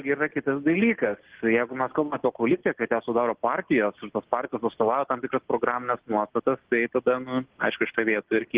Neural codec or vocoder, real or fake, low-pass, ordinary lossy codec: none; real; 3.6 kHz; Opus, 16 kbps